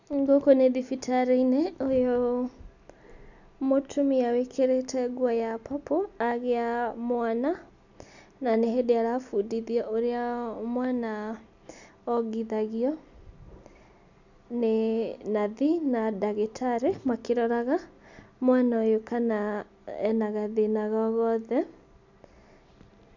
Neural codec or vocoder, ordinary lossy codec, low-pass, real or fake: none; none; 7.2 kHz; real